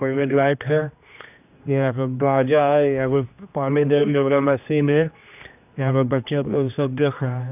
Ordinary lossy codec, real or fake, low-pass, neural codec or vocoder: none; fake; 3.6 kHz; codec, 16 kHz, 1 kbps, X-Codec, HuBERT features, trained on general audio